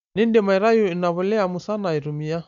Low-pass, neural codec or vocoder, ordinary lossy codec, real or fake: 7.2 kHz; none; none; real